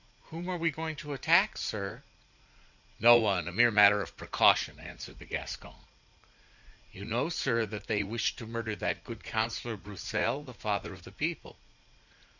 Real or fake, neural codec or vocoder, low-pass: fake; vocoder, 44.1 kHz, 80 mel bands, Vocos; 7.2 kHz